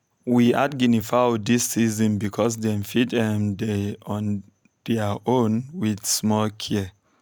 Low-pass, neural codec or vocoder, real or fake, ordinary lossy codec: none; none; real; none